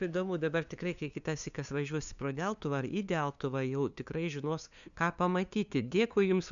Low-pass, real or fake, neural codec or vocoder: 7.2 kHz; fake; codec, 16 kHz, 2 kbps, FunCodec, trained on LibriTTS, 25 frames a second